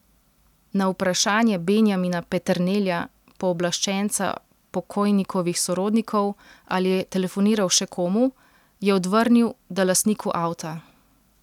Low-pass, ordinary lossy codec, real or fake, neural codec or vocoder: 19.8 kHz; none; real; none